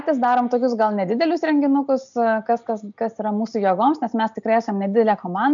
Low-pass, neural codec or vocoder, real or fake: 7.2 kHz; none; real